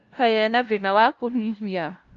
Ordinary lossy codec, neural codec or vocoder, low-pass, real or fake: Opus, 32 kbps; codec, 16 kHz, 0.5 kbps, FunCodec, trained on LibriTTS, 25 frames a second; 7.2 kHz; fake